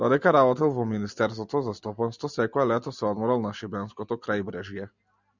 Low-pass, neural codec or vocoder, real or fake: 7.2 kHz; none; real